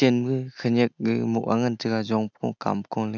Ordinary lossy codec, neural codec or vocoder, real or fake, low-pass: none; none; real; 7.2 kHz